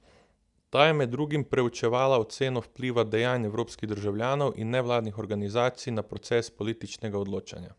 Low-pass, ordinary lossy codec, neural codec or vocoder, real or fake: 10.8 kHz; none; none; real